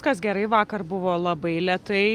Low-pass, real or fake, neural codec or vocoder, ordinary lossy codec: 14.4 kHz; real; none; Opus, 32 kbps